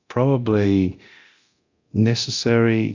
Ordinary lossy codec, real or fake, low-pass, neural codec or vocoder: AAC, 48 kbps; fake; 7.2 kHz; codec, 24 kHz, 0.9 kbps, DualCodec